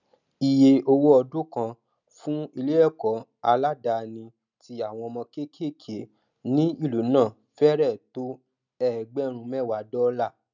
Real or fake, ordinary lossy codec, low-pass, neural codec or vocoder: real; none; 7.2 kHz; none